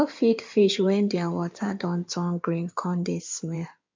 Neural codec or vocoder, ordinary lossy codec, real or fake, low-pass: codec, 16 kHz in and 24 kHz out, 2.2 kbps, FireRedTTS-2 codec; MP3, 64 kbps; fake; 7.2 kHz